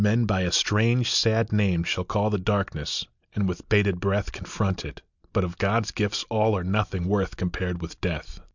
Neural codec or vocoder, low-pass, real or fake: none; 7.2 kHz; real